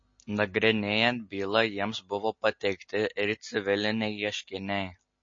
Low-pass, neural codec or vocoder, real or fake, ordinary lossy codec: 7.2 kHz; none; real; MP3, 32 kbps